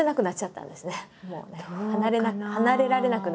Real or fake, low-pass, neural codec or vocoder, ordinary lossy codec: real; none; none; none